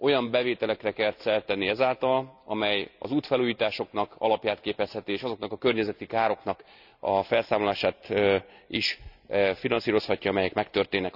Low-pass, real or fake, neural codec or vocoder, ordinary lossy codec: 5.4 kHz; real; none; none